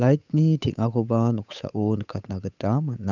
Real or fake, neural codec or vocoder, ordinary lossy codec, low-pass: fake; codec, 16 kHz, 8 kbps, FunCodec, trained on Chinese and English, 25 frames a second; none; 7.2 kHz